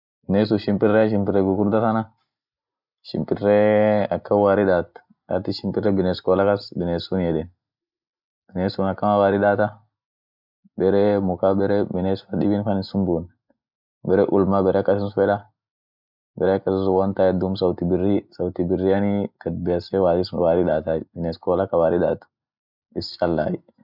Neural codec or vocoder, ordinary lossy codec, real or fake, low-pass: none; none; real; 5.4 kHz